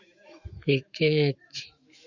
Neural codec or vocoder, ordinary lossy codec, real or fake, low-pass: autoencoder, 48 kHz, 128 numbers a frame, DAC-VAE, trained on Japanese speech; Opus, 64 kbps; fake; 7.2 kHz